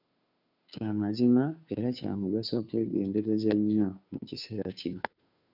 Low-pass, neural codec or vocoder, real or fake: 5.4 kHz; codec, 16 kHz, 2 kbps, FunCodec, trained on Chinese and English, 25 frames a second; fake